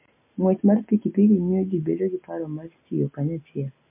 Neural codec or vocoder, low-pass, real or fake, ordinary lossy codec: none; 3.6 kHz; real; MP3, 24 kbps